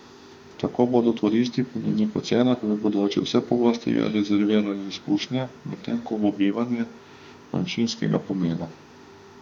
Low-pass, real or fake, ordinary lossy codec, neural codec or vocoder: 19.8 kHz; fake; none; autoencoder, 48 kHz, 32 numbers a frame, DAC-VAE, trained on Japanese speech